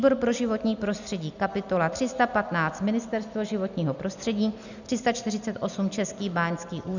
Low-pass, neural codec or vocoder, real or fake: 7.2 kHz; none; real